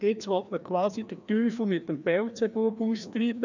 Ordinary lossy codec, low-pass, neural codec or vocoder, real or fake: none; 7.2 kHz; codec, 16 kHz, 1 kbps, FreqCodec, larger model; fake